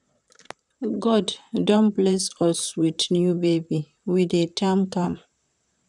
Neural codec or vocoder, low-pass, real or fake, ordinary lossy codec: vocoder, 44.1 kHz, 128 mel bands, Pupu-Vocoder; 10.8 kHz; fake; none